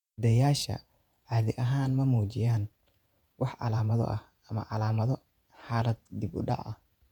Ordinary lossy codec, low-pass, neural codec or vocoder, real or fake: none; 19.8 kHz; vocoder, 48 kHz, 128 mel bands, Vocos; fake